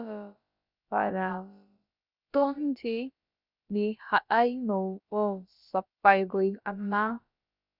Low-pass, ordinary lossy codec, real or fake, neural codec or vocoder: 5.4 kHz; Opus, 64 kbps; fake; codec, 16 kHz, about 1 kbps, DyCAST, with the encoder's durations